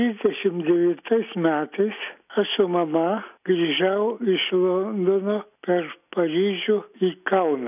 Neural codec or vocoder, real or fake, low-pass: none; real; 3.6 kHz